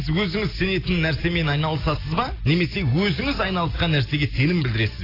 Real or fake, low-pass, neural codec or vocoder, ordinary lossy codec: real; 5.4 kHz; none; AAC, 24 kbps